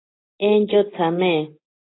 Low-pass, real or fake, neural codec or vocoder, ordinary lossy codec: 7.2 kHz; real; none; AAC, 16 kbps